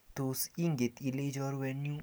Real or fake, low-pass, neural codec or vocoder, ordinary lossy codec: real; none; none; none